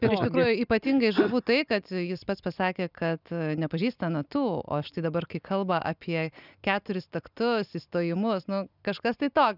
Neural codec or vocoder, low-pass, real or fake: none; 5.4 kHz; real